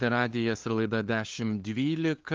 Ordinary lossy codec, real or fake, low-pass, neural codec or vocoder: Opus, 24 kbps; fake; 7.2 kHz; codec, 16 kHz, 2 kbps, FunCodec, trained on LibriTTS, 25 frames a second